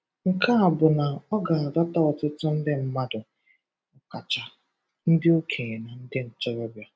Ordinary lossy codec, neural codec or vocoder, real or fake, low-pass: none; none; real; none